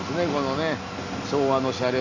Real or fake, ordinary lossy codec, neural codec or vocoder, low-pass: real; AAC, 48 kbps; none; 7.2 kHz